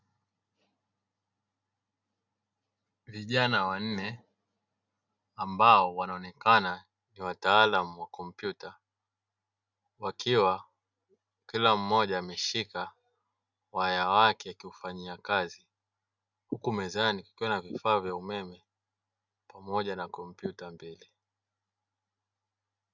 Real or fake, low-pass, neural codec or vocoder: real; 7.2 kHz; none